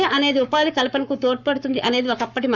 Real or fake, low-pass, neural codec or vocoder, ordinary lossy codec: fake; 7.2 kHz; codec, 44.1 kHz, 7.8 kbps, Pupu-Codec; Opus, 64 kbps